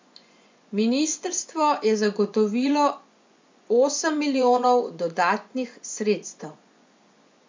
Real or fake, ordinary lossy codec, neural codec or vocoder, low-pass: fake; MP3, 64 kbps; vocoder, 24 kHz, 100 mel bands, Vocos; 7.2 kHz